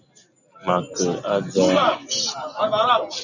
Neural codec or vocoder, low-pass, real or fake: none; 7.2 kHz; real